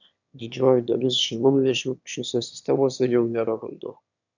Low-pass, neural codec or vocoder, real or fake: 7.2 kHz; autoencoder, 22.05 kHz, a latent of 192 numbers a frame, VITS, trained on one speaker; fake